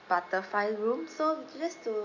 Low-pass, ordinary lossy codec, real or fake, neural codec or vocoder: 7.2 kHz; none; real; none